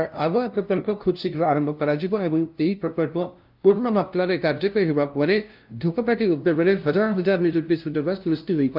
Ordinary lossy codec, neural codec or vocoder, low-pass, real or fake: Opus, 24 kbps; codec, 16 kHz, 0.5 kbps, FunCodec, trained on LibriTTS, 25 frames a second; 5.4 kHz; fake